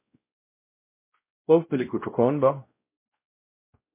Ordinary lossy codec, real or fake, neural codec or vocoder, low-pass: MP3, 24 kbps; fake; codec, 16 kHz, 0.5 kbps, X-Codec, WavLM features, trained on Multilingual LibriSpeech; 3.6 kHz